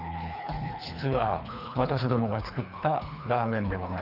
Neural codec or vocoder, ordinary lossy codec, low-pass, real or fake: codec, 24 kHz, 3 kbps, HILCodec; none; 5.4 kHz; fake